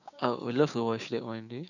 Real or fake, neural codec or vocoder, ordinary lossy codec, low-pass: fake; codec, 16 kHz, 6 kbps, DAC; none; 7.2 kHz